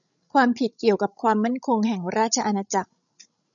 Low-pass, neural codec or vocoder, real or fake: 7.2 kHz; codec, 16 kHz, 16 kbps, FreqCodec, larger model; fake